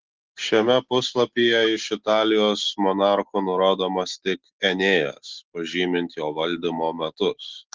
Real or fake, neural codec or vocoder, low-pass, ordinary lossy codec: real; none; 7.2 kHz; Opus, 16 kbps